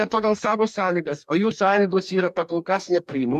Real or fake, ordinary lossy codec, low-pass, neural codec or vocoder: fake; MP3, 96 kbps; 14.4 kHz; codec, 44.1 kHz, 2.6 kbps, DAC